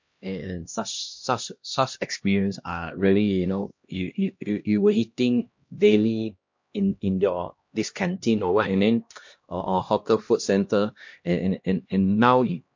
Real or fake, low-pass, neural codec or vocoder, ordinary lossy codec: fake; 7.2 kHz; codec, 16 kHz, 1 kbps, X-Codec, HuBERT features, trained on LibriSpeech; MP3, 48 kbps